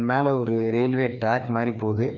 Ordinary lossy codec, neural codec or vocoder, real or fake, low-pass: none; codec, 16 kHz, 2 kbps, FreqCodec, larger model; fake; 7.2 kHz